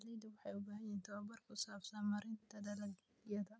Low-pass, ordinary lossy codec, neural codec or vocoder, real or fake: none; none; none; real